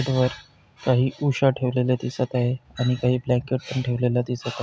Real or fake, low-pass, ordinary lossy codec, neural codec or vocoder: real; none; none; none